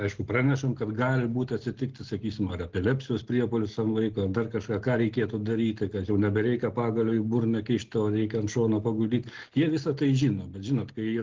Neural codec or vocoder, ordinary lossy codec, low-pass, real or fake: none; Opus, 16 kbps; 7.2 kHz; real